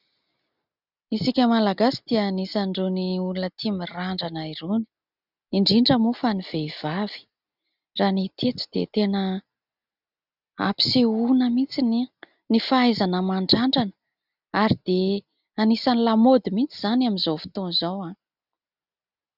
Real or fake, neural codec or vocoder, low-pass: real; none; 5.4 kHz